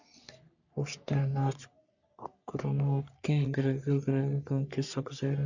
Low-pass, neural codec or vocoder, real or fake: 7.2 kHz; codec, 44.1 kHz, 3.4 kbps, Pupu-Codec; fake